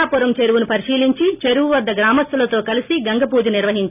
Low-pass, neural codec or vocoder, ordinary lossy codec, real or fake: 3.6 kHz; none; none; real